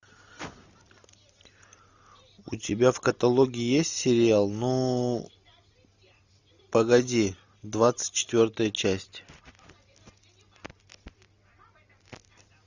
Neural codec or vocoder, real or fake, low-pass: none; real; 7.2 kHz